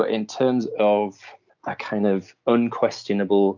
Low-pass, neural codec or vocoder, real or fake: 7.2 kHz; none; real